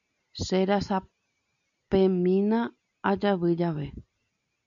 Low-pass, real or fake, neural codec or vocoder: 7.2 kHz; real; none